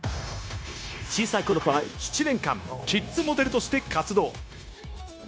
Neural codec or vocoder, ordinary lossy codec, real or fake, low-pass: codec, 16 kHz, 0.9 kbps, LongCat-Audio-Codec; none; fake; none